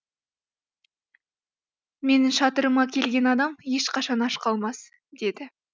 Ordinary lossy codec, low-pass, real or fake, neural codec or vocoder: none; none; real; none